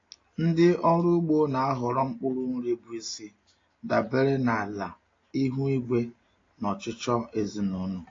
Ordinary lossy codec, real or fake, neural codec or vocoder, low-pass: AAC, 32 kbps; real; none; 7.2 kHz